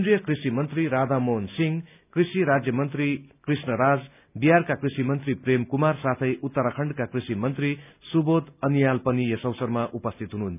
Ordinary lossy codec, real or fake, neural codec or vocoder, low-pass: none; real; none; 3.6 kHz